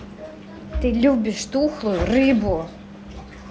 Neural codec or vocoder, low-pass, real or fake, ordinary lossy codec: none; none; real; none